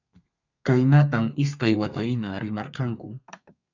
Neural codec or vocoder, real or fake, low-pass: codec, 32 kHz, 1.9 kbps, SNAC; fake; 7.2 kHz